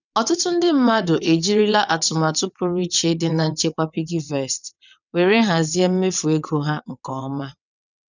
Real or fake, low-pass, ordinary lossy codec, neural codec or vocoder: fake; 7.2 kHz; none; vocoder, 22.05 kHz, 80 mel bands, WaveNeXt